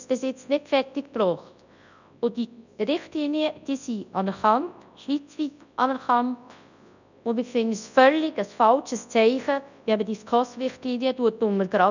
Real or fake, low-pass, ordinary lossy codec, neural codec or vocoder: fake; 7.2 kHz; none; codec, 24 kHz, 0.9 kbps, WavTokenizer, large speech release